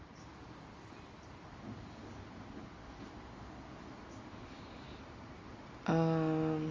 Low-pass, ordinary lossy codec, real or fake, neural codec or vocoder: 7.2 kHz; Opus, 32 kbps; real; none